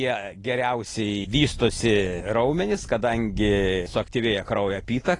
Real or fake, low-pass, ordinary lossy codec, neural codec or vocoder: real; 10.8 kHz; AAC, 32 kbps; none